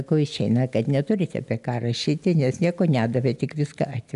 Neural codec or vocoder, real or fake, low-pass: none; real; 10.8 kHz